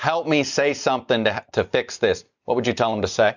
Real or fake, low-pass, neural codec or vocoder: real; 7.2 kHz; none